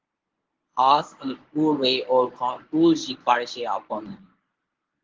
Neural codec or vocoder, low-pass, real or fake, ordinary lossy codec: codec, 24 kHz, 0.9 kbps, WavTokenizer, medium speech release version 1; 7.2 kHz; fake; Opus, 16 kbps